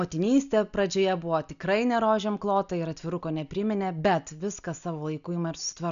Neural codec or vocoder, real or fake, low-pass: none; real; 7.2 kHz